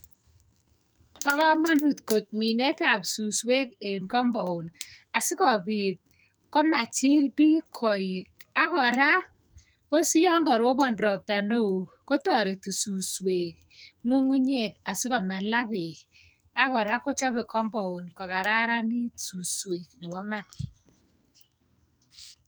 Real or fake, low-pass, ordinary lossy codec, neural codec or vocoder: fake; none; none; codec, 44.1 kHz, 2.6 kbps, SNAC